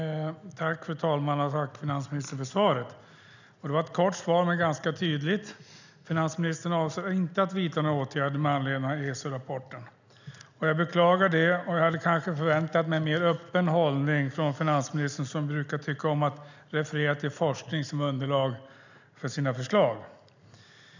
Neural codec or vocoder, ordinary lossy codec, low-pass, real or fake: none; none; 7.2 kHz; real